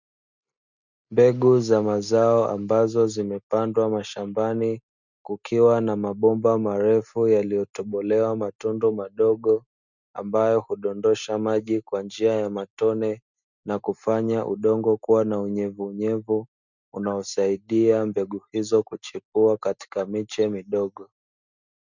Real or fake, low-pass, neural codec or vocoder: real; 7.2 kHz; none